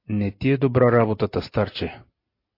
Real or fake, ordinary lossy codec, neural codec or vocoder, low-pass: real; MP3, 32 kbps; none; 5.4 kHz